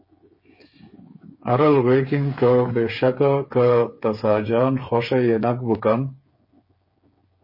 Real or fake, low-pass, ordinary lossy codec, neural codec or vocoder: fake; 5.4 kHz; MP3, 24 kbps; codec, 16 kHz, 8 kbps, FreqCodec, smaller model